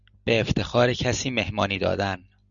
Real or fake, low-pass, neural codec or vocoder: real; 7.2 kHz; none